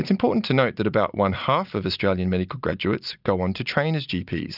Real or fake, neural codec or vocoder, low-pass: real; none; 5.4 kHz